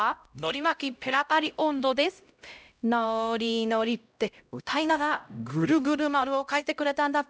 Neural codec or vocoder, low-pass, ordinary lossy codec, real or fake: codec, 16 kHz, 0.5 kbps, X-Codec, HuBERT features, trained on LibriSpeech; none; none; fake